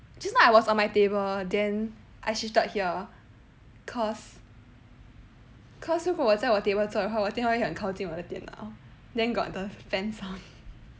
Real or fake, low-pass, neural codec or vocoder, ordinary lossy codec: real; none; none; none